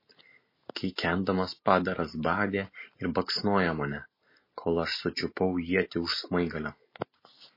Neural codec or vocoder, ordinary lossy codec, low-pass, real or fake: none; MP3, 24 kbps; 5.4 kHz; real